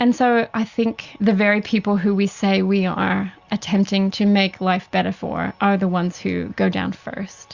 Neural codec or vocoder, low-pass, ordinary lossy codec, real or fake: none; 7.2 kHz; Opus, 64 kbps; real